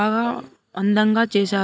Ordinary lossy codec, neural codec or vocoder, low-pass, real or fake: none; none; none; real